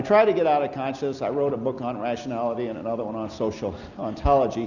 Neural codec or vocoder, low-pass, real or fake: none; 7.2 kHz; real